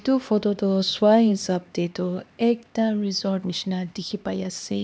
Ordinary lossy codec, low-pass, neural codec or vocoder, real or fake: none; none; codec, 16 kHz, 2 kbps, X-Codec, HuBERT features, trained on LibriSpeech; fake